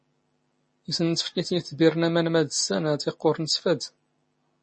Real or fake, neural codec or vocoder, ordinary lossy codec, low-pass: real; none; MP3, 32 kbps; 10.8 kHz